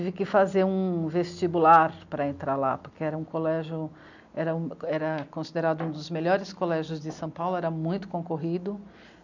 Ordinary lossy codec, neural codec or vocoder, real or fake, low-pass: none; none; real; 7.2 kHz